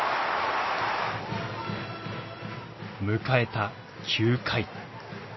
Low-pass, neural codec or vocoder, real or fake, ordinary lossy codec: 7.2 kHz; none; real; MP3, 24 kbps